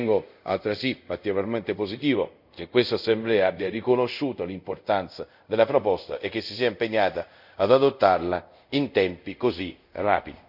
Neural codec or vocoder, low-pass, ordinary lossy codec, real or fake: codec, 24 kHz, 0.5 kbps, DualCodec; 5.4 kHz; none; fake